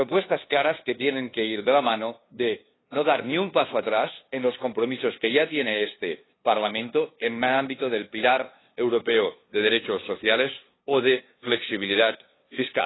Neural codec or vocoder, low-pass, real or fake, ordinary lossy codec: codec, 16 kHz, 2 kbps, FunCodec, trained on LibriTTS, 25 frames a second; 7.2 kHz; fake; AAC, 16 kbps